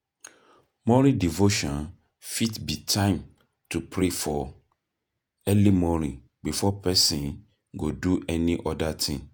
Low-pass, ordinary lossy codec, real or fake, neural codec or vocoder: none; none; fake; vocoder, 48 kHz, 128 mel bands, Vocos